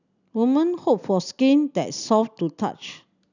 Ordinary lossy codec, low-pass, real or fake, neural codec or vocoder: none; 7.2 kHz; real; none